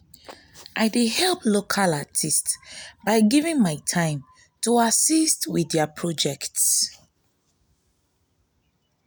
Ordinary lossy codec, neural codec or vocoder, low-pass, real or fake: none; none; none; real